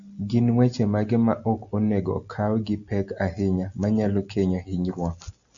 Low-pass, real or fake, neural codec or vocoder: 7.2 kHz; real; none